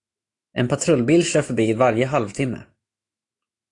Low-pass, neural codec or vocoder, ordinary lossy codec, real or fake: 10.8 kHz; autoencoder, 48 kHz, 128 numbers a frame, DAC-VAE, trained on Japanese speech; AAC, 48 kbps; fake